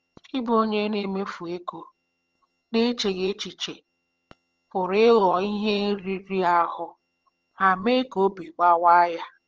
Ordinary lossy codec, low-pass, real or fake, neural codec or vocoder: Opus, 24 kbps; 7.2 kHz; fake; vocoder, 22.05 kHz, 80 mel bands, HiFi-GAN